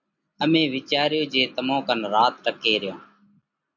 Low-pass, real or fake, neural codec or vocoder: 7.2 kHz; real; none